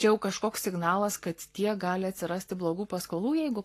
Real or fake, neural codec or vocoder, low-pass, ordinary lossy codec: fake; codec, 44.1 kHz, 7.8 kbps, Pupu-Codec; 14.4 kHz; AAC, 48 kbps